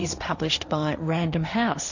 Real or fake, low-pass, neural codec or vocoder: fake; 7.2 kHz; codec, 16 kHz, 1.1 kbps, Voila-Tokenizer